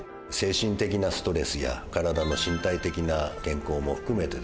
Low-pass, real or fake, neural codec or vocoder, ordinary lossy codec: none; real; none; none